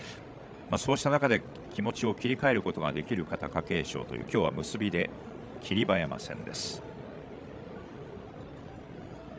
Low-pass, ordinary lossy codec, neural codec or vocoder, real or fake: none; none; codec, 16 kHz, 16 kbps, FreqCodec, larger model; fake